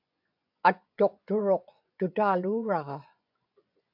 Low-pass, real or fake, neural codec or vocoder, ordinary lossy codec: 5.4 kHz; fake; vocoder, 24 kHz, 100 mel bands, Vocos; AAC, 48 kbps